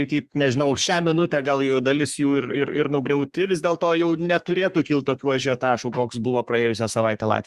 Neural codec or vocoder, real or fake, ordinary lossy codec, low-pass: codec, 32 kHz, 1.9 kbps, SNAC; fake; Opus, 64 kbps; 14.4 kHz